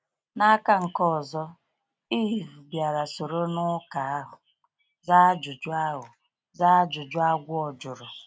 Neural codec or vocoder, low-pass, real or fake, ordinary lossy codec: none; none; real; none